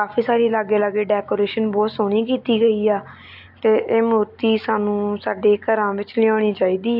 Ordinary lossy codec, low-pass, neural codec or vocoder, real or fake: MP3, 48 kbps; 5.4 kHz; none; real